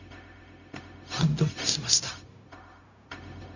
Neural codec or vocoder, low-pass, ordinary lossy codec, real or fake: codec, 16 kHz, 0.4 kbps, LongCat-Audio-Codec; 7.2 kHz; none; fake